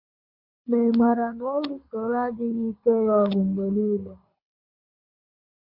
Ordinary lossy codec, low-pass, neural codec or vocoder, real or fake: MP3, 32 kbps; 5.4 kHz; codec, 24 kHz, 6 kbps, HILCodec; fake